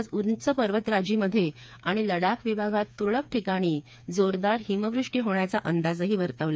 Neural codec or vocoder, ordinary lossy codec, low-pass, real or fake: codec, 16 kHz, 4 kbps, FreqCodec, smaller model; none; none; fake